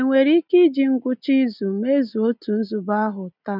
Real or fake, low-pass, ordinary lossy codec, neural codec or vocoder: real; 5.4 kHz; none; none